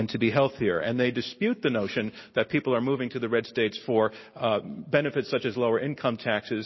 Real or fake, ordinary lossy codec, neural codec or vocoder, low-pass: real; MP3, 24 kbps; none; 7.2 kHz